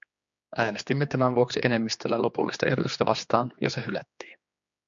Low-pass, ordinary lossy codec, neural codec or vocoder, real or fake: 7.2 kHz; MP3, 48 kbps; codec, 16 kHz, 2 kbps, X-Codec, HuBERT features, trained on general audio; fake